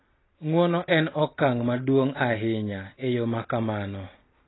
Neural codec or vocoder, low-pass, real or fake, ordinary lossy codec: none; 7.2 kHz; real; AAC, 16 kbps